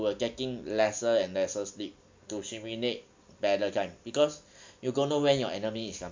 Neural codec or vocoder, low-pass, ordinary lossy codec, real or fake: none; 7.2 kHz; MP3, 64 kbps; real